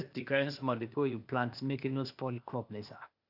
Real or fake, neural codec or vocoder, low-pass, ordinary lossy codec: fake; codec, 16 kHz, 0.8 kbps, ZipCodec; 5.4 kHz; none